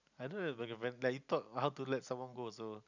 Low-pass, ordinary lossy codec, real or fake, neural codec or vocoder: 7.2 kHz; none; real; none